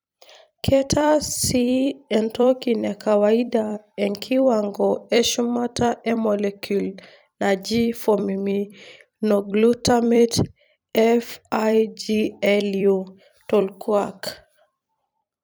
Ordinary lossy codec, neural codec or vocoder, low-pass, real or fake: none; vocoder, 44.1 kHz, 128 mel bands every 256 samples, BigVGAN v2; none; fake